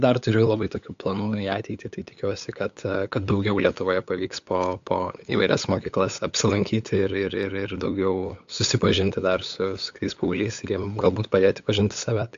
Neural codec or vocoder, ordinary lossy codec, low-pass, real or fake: codec, 16 kHz, 8 kbps, FunCodec, trained on LibriTTS, 25 frames a second; AAC, 64 kbps; 7.2 kHz; fake